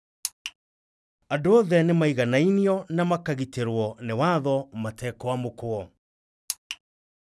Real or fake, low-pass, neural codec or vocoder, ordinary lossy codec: real; none; none; none